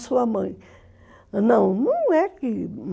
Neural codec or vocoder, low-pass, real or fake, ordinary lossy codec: none; none; real; none